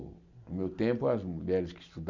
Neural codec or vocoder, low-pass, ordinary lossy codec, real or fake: none; 7.2 kHz; none; real